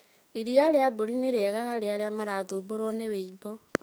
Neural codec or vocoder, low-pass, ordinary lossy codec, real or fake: codec, 44.1 kHz, 2.6 kbps, SNAC; none; none; fake